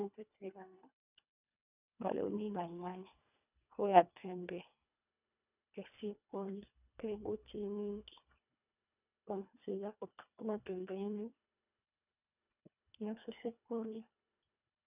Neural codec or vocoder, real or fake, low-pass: codec, 24 kHz, 1.5 kbps, HILCodec; fake; 3.6 kHz